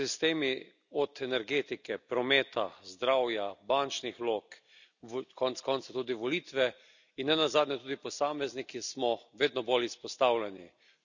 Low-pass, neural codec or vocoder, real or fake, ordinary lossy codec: 7.2 kHz; none; real; none